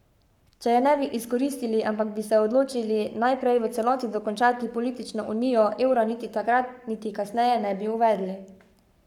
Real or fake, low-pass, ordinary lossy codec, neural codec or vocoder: fake; 19.8 kHz; none; codec, 44.1 kHz, 7.8 kbps, Pupu-Codec